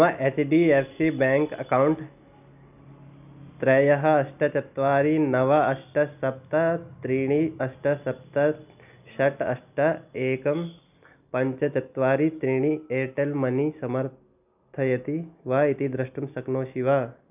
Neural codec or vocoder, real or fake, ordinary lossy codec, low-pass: none; real; none; 3.6 kHz